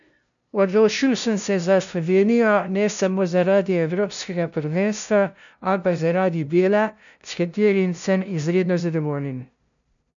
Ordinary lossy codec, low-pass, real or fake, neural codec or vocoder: none; 7.2 kHz; fake; codec, 16 kHz, 0.5 kbps, FunCodec, trained on LibriTTS, 25 frames a second